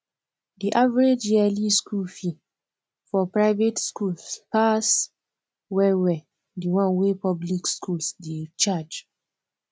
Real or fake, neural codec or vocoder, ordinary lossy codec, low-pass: real; none; none; none